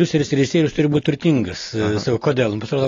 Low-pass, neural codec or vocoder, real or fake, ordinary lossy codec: 7.2 kHz; none; real; AAC, 32 kbps